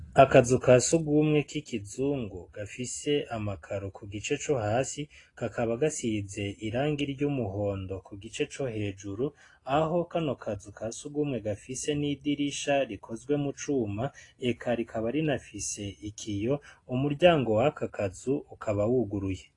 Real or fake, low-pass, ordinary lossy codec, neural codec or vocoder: real; 10.8 kHz; AAC, 32 kbps; none